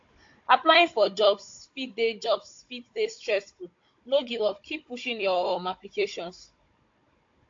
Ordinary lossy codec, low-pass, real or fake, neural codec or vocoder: AAC, 48 kbps; 7.2 kHz; fake; codec, 16 kHz, 16 kbps, FunCodec, trained on Chinese and English, 50 frames a second